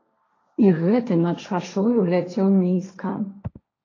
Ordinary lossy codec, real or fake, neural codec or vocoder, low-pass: AAC, 32 kbps; fake; codec, 16 kHz, 1.1 kbps, Voila-Tokenizer; 7.2 kHz